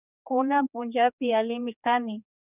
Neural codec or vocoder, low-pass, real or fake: codec, 16 kHz, 2 kbps, X-Codec, HuBERT features, trained on general audio; 3.6 kHz; fake